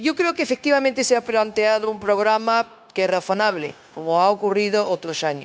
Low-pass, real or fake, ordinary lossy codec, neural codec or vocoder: none; fake; none; codec, 16 kHz, 0.9 kbps, LongCat-Audio-Codec